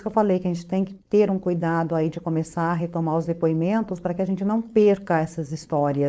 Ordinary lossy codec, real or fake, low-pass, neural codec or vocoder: none; fake; none; codec, 16 kHz, 4.8 kbps, FACodec